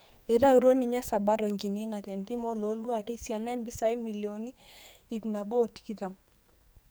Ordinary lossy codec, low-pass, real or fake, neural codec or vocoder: none; none; fake; codec, 44.1 kHz, 2.6 kbps, SNAC